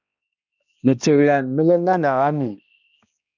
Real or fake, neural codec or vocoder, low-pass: fake; codec, 16 kHz, 1 kbps, X-Codec, HuBERT features, trained on balanced general audio; 7.2 kHz